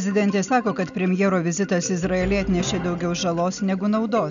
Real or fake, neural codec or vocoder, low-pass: real; none; 7.2 kHz